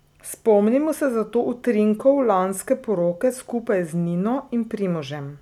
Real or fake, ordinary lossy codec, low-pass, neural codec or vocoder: real; none; 19.8 kHz; none